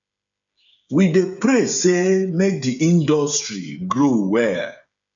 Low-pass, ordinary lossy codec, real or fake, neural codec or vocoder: 7.2 kHz; MP3, 64 kbps; fake; codec, 16 kHz, 8 kbps, FreqCodec, smaller model